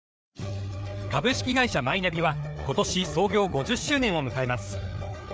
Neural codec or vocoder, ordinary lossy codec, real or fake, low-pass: codec, 16 kHz, 4 kbps, FreqCodec, larger model; none; fake; none